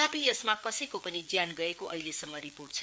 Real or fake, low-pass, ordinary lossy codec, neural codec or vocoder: fake; none; none; codec, 16 kHz, 4 kbps, FreqCodec, larger model